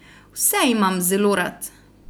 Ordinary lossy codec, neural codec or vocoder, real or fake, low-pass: none; none; real; none